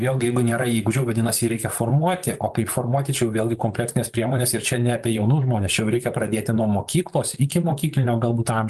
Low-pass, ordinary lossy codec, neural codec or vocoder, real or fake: 14.4 kHz; Opus, 32 kbps; vocoder, 44.1 kHz, 128 mel bands, Pupu-Vocoder; fake